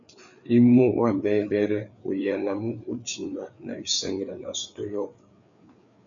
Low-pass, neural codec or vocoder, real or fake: 7.2 kHz; codec, 16 kHz, 4 kbps, FreqCodec, larger model; fake